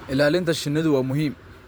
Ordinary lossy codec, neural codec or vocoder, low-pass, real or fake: none; vocoder, 44.1 kHz, 128 mel bands every 512 samples, BigVGAN v2; none; fake